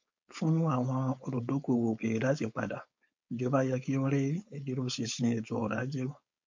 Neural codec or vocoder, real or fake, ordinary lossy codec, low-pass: codec, 16 kHz, 4.8 kbps, FACodec; fake; MP3, 64 kbps; 7.2 kHz